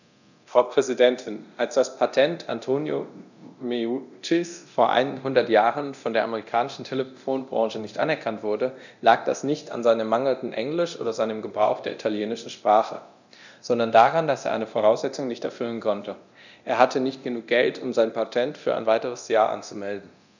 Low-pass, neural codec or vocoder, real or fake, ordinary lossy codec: 7.2 kHz; codec, 24 kHz, 0.9 kbps, DualCodec; fake; none